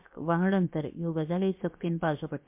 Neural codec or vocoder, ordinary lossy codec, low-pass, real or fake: codec, 16 kHz, about 1 kbps, DyCAST, with the encoder's durations; MP3, 32 kbps; 3.6 kHz; fake